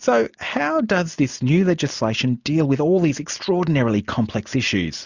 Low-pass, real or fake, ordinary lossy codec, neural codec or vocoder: 7.2 kHz; real; Opus, 64 kbps; none